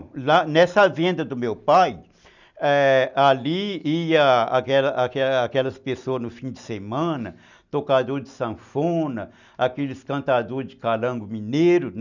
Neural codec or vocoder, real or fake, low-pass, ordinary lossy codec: none; real; 7.2 kHz; none